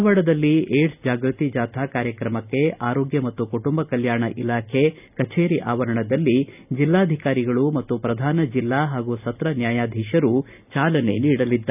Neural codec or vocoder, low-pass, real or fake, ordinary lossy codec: none; 3.6 kHz; real; none